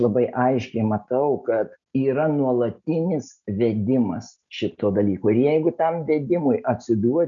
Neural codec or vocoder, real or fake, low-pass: none; real; 7.2 kHz